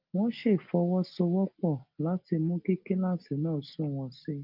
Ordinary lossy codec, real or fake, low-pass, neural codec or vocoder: Opus, 24 kbps; real; 5.4 kHz; none